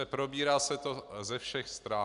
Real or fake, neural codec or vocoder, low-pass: real; none; 10.8 kHz